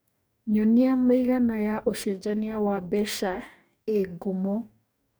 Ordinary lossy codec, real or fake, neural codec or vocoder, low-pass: none; fake; codec, 44.1 kHz, 2.6 kbps, DAC; none